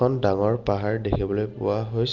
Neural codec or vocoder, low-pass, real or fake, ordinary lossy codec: none; 7.2 kHz; real; Opus, 32 kbps